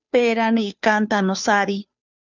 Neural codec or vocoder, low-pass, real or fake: codec, 16 kHz, 2 kbps, FunCodec, trained on Chinese and English, 25 frames a second; 7.2 kHz; fake